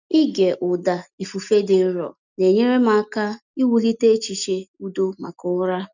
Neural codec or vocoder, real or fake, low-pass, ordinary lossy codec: none; real; 7.2 kHz; none